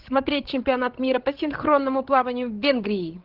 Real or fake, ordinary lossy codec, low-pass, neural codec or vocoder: fake; Opus, 16 kbps; 5.4 kHz; codec, 16 kHz, 16 kbps, FreqCodec, larger model